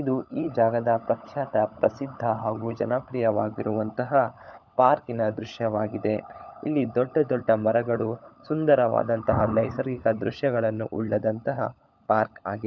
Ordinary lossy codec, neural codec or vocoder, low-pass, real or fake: none; codec, 16 kHz, 16 kbps, FunCodec, trained on LibriTTS, 50 frames a second; none; fake